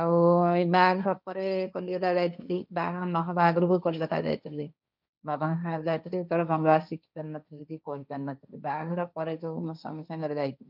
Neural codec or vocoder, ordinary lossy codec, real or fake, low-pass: codec, 16 kHz, 1.1 kbps, Voila-Tokenizer; none; fake; 5.4 kHz